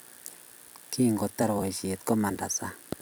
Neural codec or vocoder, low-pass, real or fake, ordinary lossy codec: vocoder, 44.1 kHz, 128 mel bands every 256 samples, BigVGAN v2; none; fake; none